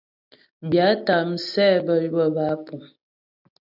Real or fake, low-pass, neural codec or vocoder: real; 5.4 kHz; none